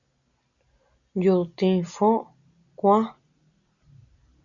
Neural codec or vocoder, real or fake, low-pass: none; real; 7.2 kHz